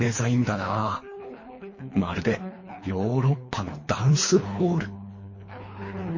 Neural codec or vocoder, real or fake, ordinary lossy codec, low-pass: codec, 24 kHz, 3 kbps, HILCodec; fake; MP3, 32 kbps; 7.2 kHz